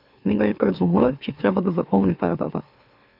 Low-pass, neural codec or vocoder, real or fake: 5.4 kHz; autoencoder, 44.1 kHz, a latent of 192 numbers a frame, MeloTTS; fake